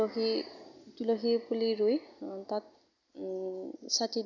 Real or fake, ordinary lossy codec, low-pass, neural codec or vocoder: real; none; 7.2 kHz; none